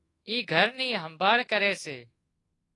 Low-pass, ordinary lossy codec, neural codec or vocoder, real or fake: 10.8 kHz; AAC, 32 kbps; codec, 24 kHz, 1.2 kbps, DualCodec; fake